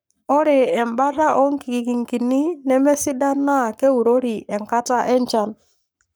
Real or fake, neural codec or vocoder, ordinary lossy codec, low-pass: fake; codec, 44.1 kHz, 7.8 kbps, Pupu-Codec; none; none